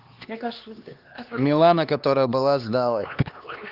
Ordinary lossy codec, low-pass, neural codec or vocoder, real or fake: Opus, 32 kbps; 5.4 kHz; codec, 16 kHz, 2 kbps, X-Codec, HuBERT features, trained on LibriSpeech; fake